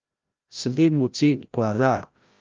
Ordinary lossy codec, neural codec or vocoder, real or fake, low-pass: Opus, 32 kbps; codec, 16 kHz, 0.5 kbps, FreqCodec, larger model; fake; 7.2 kHz